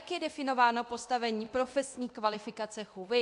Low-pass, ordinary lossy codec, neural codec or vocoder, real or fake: 10.8 kHz; AAC, 64 kbps; codec, 24 kHz, 0.9 kbps, DualCodec; fake